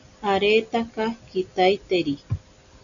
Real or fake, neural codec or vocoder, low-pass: real; none; 7.2 kHz